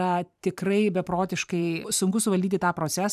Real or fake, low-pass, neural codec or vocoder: fake; 14.4 kHz; vocoder, 44.1 kHz, 128 mel bands every 512 samples, BigVGAN v2